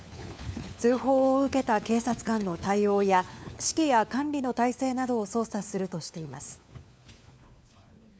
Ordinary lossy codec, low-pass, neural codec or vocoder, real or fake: none; none; codec, 16 kHz, 4 kbps, FunCodec, trained on LibriTTS, 50 frames a second; fake